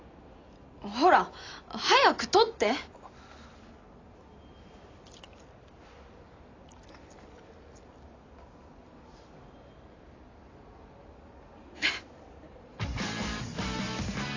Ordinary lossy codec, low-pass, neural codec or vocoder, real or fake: AAC, 32 kbps; 7.2 kHz; none; real